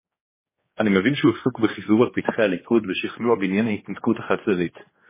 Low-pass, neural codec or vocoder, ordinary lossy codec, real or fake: 3.6 kHz; codec, 16 kHz, 2 kbps, X-Codec, HuBERT features, trained on general audio; MP3, 16 kbps; fake